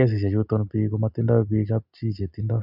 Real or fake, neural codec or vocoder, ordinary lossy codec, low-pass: real; none; none; 5.4 kHz